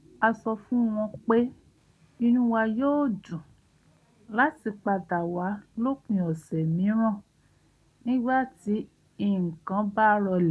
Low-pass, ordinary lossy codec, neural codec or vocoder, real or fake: none; none; none; real